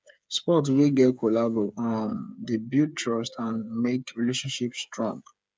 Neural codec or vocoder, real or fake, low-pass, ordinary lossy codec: codec, 16 kHz, 8 kbps, FreqCodec, smaller model; fake; none; none